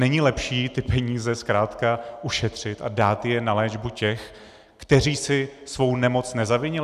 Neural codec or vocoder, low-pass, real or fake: none; 14.4 kHz; real